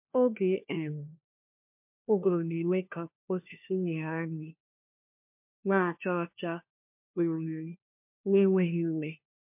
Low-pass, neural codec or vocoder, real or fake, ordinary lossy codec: 3.6 kHz; codec, 16 kHz, 1 kbps, FunCodec, trained on LibriTTS, 50 frames a second; fake; MP3, 32 kbps